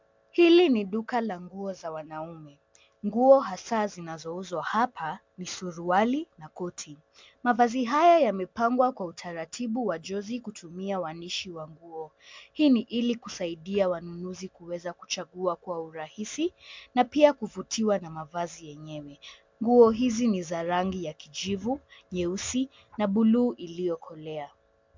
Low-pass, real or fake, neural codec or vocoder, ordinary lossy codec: 7.2 kHz; real; none; MP3, 64 kbps